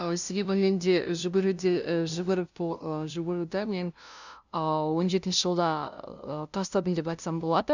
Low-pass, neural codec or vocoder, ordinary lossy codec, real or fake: 7.2 kHz; codec, 16 kHz, 0.5 kbps, FunCodec, trained on LibriTTS, 25 frames a second; none; fake